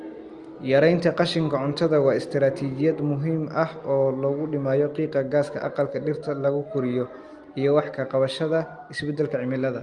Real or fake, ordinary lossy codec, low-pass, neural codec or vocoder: real; Opus, 32 kbps; 10.8 kHz; none